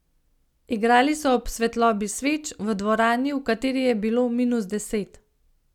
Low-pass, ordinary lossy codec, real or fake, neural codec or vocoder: 19.8 kHz; none; real; none